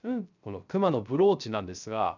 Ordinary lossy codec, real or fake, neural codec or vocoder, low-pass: none; fake; codec, 16 kHz, 0.3 kbps, FocalCodec; 7.2 kHz